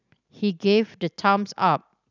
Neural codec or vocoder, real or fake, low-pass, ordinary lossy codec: none; real; 7.2 kHz; none